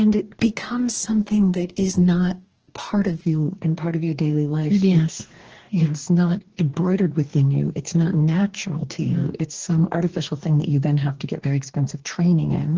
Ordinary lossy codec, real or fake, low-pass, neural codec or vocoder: Opus, 16 kbps; fake; 7.2 kHz; codec, 44.1 kHz, 2.6 kbps, DAC